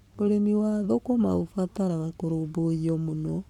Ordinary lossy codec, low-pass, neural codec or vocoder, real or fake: none; 19.8 kHz; codec, 44.1 kHz, 7.8 kbps, Pupu-Codec; fake